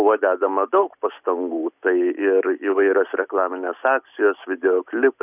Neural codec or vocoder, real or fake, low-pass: none; real; 3.6 kHz